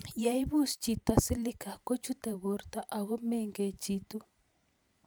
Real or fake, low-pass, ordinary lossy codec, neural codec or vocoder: fake; none; none; vocoder, 44.1 kHz, 128 mel bands every 512 samples, BigVGAN v2